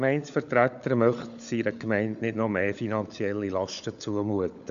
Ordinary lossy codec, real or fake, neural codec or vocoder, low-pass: MP3, 96 kbps; fake; codec, 16 kHz, 16 kbps, FunCodec, trained on LibriTTS, 50 frames a second; 7.2 kHz